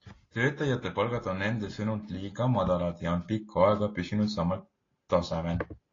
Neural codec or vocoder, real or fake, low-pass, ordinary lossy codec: none; real; 7.2 kHz; AAC, 32 kbps